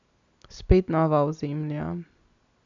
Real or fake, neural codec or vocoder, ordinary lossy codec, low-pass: real; none; none; 7.2 kHz